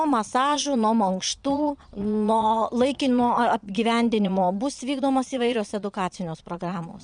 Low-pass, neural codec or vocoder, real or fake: 9.9 kHz; vocoder, 22.05 kHz, 80 mel bands, Vocos; fake